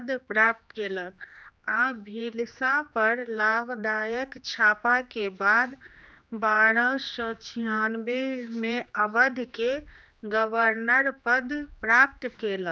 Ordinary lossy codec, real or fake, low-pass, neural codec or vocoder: none; fake; none; codec, 16 kHz, 2 kbps, X-Codec, HuBERT features, trained on general audio